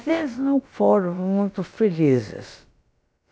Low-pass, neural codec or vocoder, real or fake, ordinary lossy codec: none; codec, 16 kHz, about 1 kbps, DyCAST, with the encoder's durations; fake; none